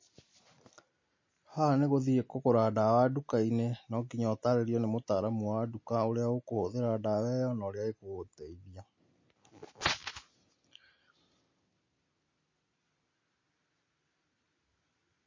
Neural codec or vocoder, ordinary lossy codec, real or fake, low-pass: none; MP3, 32 kbps; real; 7.2 kHz